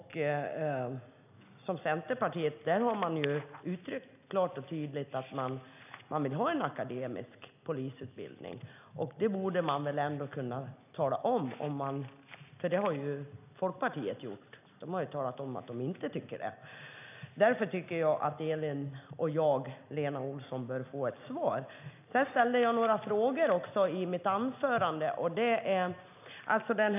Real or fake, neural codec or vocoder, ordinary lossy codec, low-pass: real; none; none; 3.6 kHz